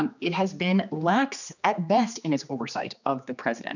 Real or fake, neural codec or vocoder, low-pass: fake; codec, 16 kHz, 2 kbps, X-Codec, HuBERT features, trained on general audio; 7.2 kHz